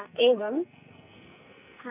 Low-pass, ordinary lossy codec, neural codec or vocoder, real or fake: 3.6 kHz; none; codec, 44.1 kHz, 2.6 kbps, SNAC; fake